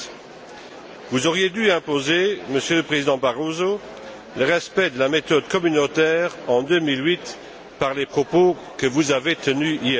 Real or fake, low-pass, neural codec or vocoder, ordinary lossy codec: real; none; none; none